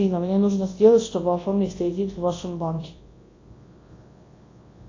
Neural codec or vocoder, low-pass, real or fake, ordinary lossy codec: codec, 24 kHz, 0.9 kbps, WavTokenizer, large speech release; 7.2 kHz; fake; AAC, 32 kbps